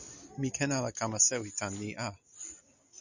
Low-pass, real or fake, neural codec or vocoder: 7.2 kHz; real; none